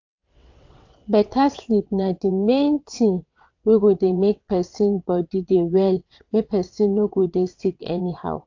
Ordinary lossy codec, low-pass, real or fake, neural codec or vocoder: AAC, 48 kbps; 7.2 kHz; fake; vocoder, 22.05 kHz, 80 mel bands, WaveNeXt